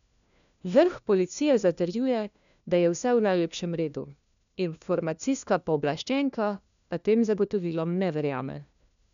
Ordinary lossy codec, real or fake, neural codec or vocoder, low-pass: none; fake; codec, 16 kHz, 1 kbps, FunCodec, trained on LibriTTS, 50 frames a second; 7.2 kHz